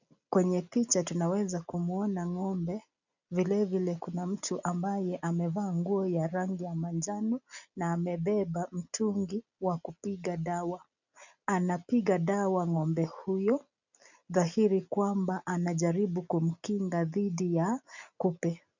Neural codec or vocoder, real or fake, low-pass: none; real; 7.2 kHz